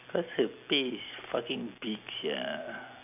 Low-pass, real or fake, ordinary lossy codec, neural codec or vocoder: 3.6 kHz; real; none; none